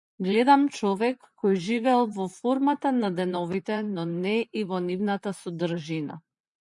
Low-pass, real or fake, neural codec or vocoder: 10.8 kHz; fake; vocoder, 44.1 kHz, 128 mel bands, Pupu-Vocoder